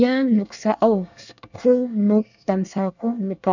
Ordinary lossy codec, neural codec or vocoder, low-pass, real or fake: none; codec, 24 kHz, 1 kbps, SNAC; 7.2 kHz; fake